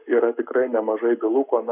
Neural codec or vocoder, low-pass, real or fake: codec, 44.1 kHz, 7.8 kbps, Pupu-Codec; 3.6 kHz; fake